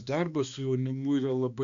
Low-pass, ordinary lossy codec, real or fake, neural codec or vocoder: 7.2 kHz; AAC, 64 kbps; fake; codec, 16 kHz, 2 kbps, X-Codec, HuBERT features, trained on balanced general audio